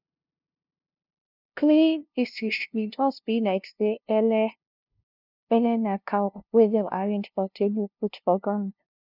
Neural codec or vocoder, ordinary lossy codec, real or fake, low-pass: codec, 16 kHz, 0.5 kbps, FunCodec, trained on LibriTTS, 25 frames a second; none; fake; 5.4 kHz